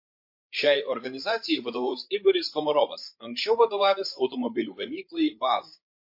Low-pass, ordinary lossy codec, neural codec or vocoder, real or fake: 5.4 kHz; MP3, 32 kbps; codec, 16 kHz, 16 kbps, FreqCodec, larger model; fake